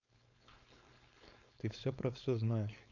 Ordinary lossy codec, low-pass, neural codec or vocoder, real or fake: none; 7.2 kHz; codec, 16 kHz, 4.8 kbps, FACodec; fake